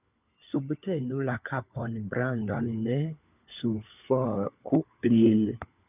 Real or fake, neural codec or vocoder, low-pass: fake; codec, 16 kHz, 4 kbps, FunCodec, trained on LibriTTS, 50 frames a second; 3.6 kHz